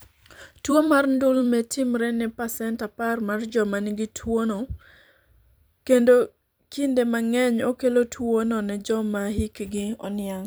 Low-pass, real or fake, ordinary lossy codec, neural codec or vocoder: none; fake; none; vocoder, 44.1 kHz, 128 mel bands every 256 samples, BigVGAN v2